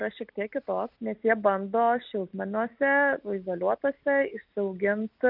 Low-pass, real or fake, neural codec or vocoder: 5.4 kHz; real; none